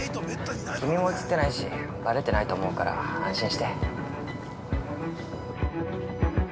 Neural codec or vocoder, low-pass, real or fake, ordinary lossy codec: none; none; real; none